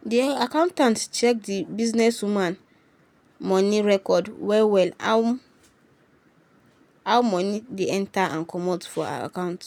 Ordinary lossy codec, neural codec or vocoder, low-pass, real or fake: none; none; 19.8 kHz; real